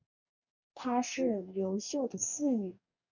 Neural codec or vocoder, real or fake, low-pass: codec, 44.1 kHz, 2.6 kbps, DAC; fake; 7.2 kHz